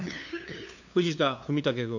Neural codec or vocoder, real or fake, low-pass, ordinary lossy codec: codec, 16 kHz, 2 kbps, FunCodec, trained on LibriTTS, 25 frames a second; fake; 7.2 kHz; none